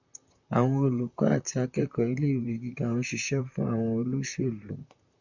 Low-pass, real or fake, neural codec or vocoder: 7.2 kHz; fake; vocoder, 44.1 kHz, 128 mel bands, Pupu-Vocoder